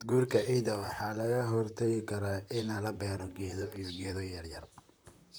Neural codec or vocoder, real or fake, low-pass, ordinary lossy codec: vocoder, 44.1 kHz, 128 mel bands, Pupu-Vocoder; fake; none; none